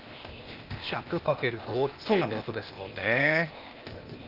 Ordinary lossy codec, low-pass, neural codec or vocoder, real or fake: Opus, 32 kbps; 5.4 kHz; codec, 16 kHz, 0.8 kbps, ZipCodec; fake